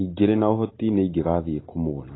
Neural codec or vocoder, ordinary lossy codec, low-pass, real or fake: none; AAC, 16 kbps; 7.2 kHz; real